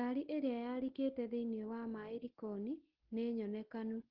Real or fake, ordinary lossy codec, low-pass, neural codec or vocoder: real; Opus, 16 kbps; 5.4 kHz; none